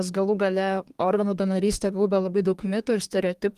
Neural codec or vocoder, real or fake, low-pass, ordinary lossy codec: codec, 32 kHz, 1.9 kbps, SNAC; fake; 14.4 kHz; Opus, 24 kbps